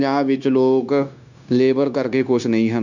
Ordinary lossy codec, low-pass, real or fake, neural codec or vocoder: none; 7.2 kHz; fake; codec, 16 kHz, 0.9 kbps, LongCat-Audio-Codec